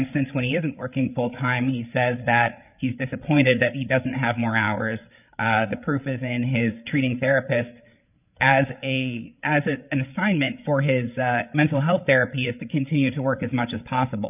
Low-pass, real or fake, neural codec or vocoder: 3.6 kHz; fake; codec, 16 kHz, 8 kbps, FreqCodec, larger model